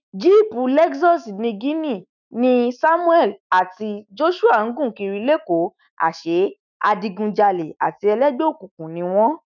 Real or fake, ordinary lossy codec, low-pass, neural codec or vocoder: fake; none; 7.2 kHz; autoencoder, 48 kHz, 128 numbers a frame, DAC-VAE, trained on Japanese speech